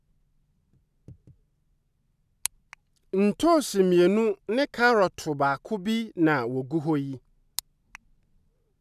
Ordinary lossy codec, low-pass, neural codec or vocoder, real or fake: none; 14.4 kHz; none; real